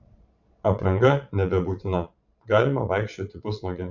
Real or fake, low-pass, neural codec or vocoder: fake; 7.2 kHz; vocoder, 22.05 kHz, 80 mel bands, Vocos